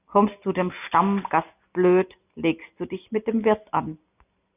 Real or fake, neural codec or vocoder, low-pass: real; none; 3.6 kHz